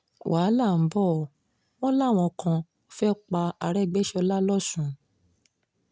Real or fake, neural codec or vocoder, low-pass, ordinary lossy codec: real; none; none; none